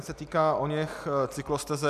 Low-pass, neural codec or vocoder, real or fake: 14.4 kHz; none; real